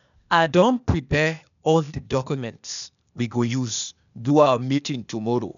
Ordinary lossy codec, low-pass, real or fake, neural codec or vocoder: none; 7.2 kHz; fake; codec, 16 kHz, 0.8 kbps, ZipCodec